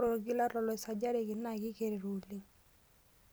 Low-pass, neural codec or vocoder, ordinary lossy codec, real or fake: none; none; none; real